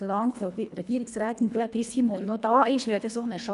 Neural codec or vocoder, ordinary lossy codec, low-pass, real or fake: codec, 24 kHz, 1.5 kbps, HILCodec; none; 10.8 kHz; fake